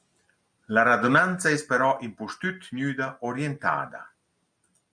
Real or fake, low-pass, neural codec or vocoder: real; 9.9 kHz; none